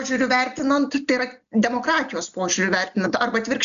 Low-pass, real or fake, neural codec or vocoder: 7.2 kHz; real; none